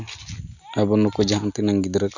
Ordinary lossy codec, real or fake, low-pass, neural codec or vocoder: none; real; 7.2 kHz; none